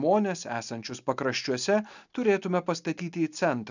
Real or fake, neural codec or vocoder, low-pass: real; none; 7.2 kHz